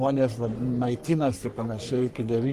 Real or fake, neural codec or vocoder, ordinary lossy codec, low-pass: fake; codec, 44.1 kHz, 3.4 kbps, Pupu-Codec; Opus, 32 kbps; 14.4 kHz